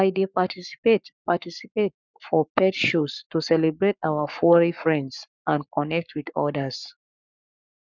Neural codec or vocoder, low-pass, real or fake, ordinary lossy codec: codec, 44.1 kHz, 7.8 kbps, Pupu-Codec; 7.2 kHz; fake; none